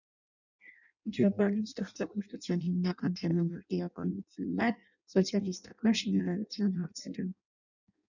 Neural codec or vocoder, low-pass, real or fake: codec, 16 kHz in and 24 kHz out, 0.6 kbps, FireRedTTS-2 codec; 7.2 kHz; fake